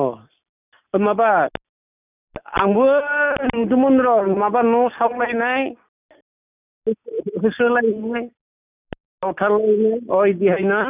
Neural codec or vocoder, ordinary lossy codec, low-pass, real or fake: none; none; 3.6 kHz; real